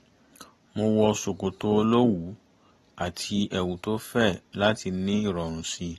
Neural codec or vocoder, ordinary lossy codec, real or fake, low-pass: none; AAC, 32 kbps; real; 19.8 kHz